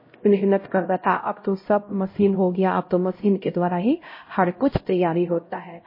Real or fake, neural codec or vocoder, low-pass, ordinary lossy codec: fake; codec, 16 kHz, 0.5 kbps, X-Codec, HuBERT features, trained on LibriSpeech; 5.4 kHz; MP3, 24 kbps